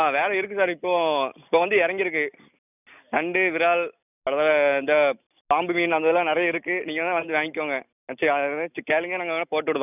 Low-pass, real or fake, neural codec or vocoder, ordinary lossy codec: 3.6 kHz; real; none; none